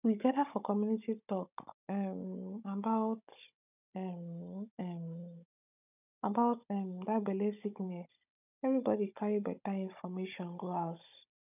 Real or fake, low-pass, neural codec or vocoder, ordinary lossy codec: fake; 3.6 kHz; codec, 16 kHz, 16 kbps, FunCodec, trained on Chinese and English, 50 frames a second; none